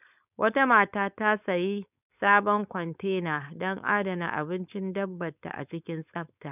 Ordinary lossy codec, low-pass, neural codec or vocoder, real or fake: none; 3.6 kHz; codec, 16 kHz, 4.8 kbps, FACodec; fake